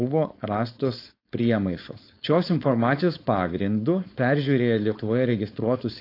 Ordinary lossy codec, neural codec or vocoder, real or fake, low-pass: AAC, 32 kbps; codec, 16 kHz, 4.8 kbps, FACodec; fake; 5.4 kHz